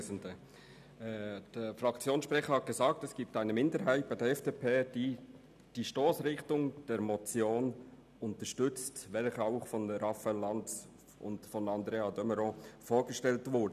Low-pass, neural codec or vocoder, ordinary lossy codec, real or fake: 14.4 kHz; none; none; real